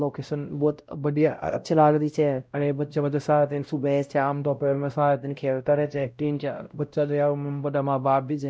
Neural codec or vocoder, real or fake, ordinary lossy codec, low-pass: codec, 16 kHz, 0.5 kbps, X-Codec, WavLM features, trained on Multilingual LibriSpeech; fake; none; none